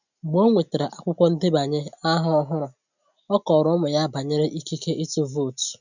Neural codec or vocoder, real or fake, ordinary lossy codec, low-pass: none; real; none; 7.2 kHz